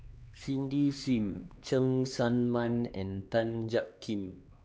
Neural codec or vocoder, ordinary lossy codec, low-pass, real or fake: codec, 16 kHz, 2 kbps, X-Codec, HuBERT features, trained on LibriSpeech; none; none; fake